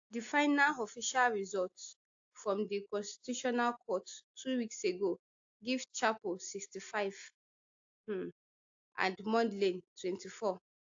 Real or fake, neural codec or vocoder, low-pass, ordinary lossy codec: real; none; 7.2 kHz; AAC, 48 kbps